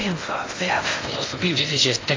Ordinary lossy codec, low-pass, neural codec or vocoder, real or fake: AAC, 32 kbps; 7.2 kHz; codec, 16 kHz in and 24 kHz out, 0.6 kbps, FocalCodec, streaming, 2048 codes; fake